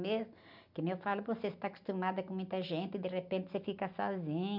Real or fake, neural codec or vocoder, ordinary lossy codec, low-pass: real; none; none; 5.4 kHz